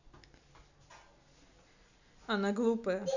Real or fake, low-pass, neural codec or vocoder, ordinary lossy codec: real; 7.2 kHz; none; none